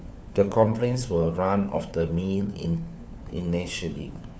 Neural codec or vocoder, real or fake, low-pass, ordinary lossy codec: codec, 16 kHz, 4 kbps, FunCodec, trained on LibriTTS, 50 frames a second; fake; none; none